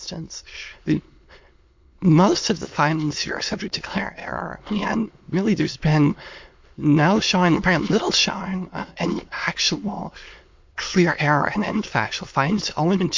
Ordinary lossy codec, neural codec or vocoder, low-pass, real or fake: MP3, 48 kbps; autoencoder, 22.05 kHz, a latent of 192 numbers a frame, VITS, trained on many speakers; 7.2 kHz; fake